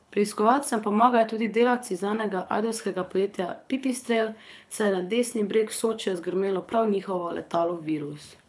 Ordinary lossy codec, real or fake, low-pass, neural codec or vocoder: none; fake; none; codec, 24 kHz, 6 kbps, HILCodec